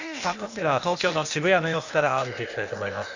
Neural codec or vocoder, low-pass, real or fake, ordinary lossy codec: codec, 16 kHz, 0.8 kbps, ZipCodec; 7.2 kHz; fake; Opus, 64 kbps